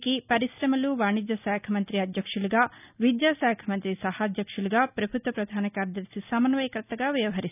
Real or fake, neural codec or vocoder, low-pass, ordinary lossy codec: real; none; 3.6 kHz; none